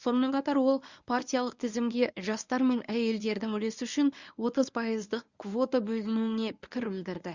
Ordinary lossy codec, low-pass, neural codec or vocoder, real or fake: Opus, 64 kbps; 7.2 kHz; codec, 24 kHz, 0.9 kbps, WavTokenizer, medium speech release version 1; fake